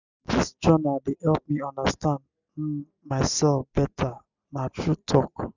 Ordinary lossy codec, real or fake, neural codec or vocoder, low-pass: none; real; none; 7.2 kHz